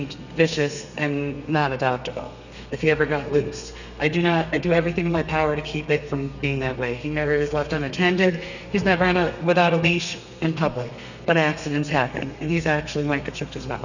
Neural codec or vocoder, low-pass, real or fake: codec, 32 kHz, 1.9 kbps, SNAC; 7.2 kHz; fake